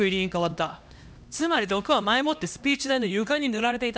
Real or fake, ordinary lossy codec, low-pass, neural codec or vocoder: fake; none; none; codec, 16 kHz, 1 kbps, X-Codec, HuBERT features, trained on LibriSpeech